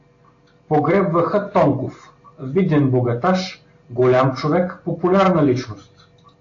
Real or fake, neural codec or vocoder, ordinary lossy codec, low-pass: real; none; AAC, 48 kbps; 7.2 kHz